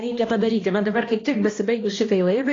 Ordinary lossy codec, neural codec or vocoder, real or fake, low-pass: AAC, 32 kbps; codec, 16 kHz, 1 kbps, X-Codec, HuBERT features, trained on balanced general audio; fake; 7.2 kHz